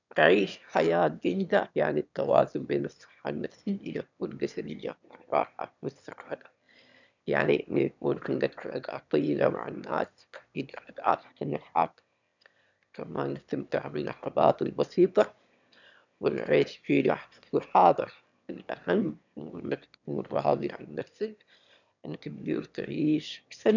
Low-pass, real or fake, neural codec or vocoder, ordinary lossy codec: 7.2 kHz; fake; autoencoder, 22.05 kHz, a latent of 192 numbers a frame, VITS, trained on one speaker; none